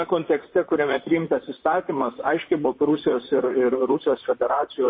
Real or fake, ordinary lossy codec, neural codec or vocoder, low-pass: fake; MP3, 24 kbps; vocoder, 44.1 kHz, 128 mel bands, Pupu-Vocoder; 7.2 kHz